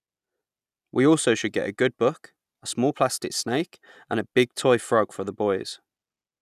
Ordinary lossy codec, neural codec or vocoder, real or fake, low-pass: none; none; real; 14.4 kHz